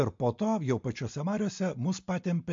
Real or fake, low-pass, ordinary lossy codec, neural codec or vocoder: real; 7.2 kHz; MP3, 48 kbps; none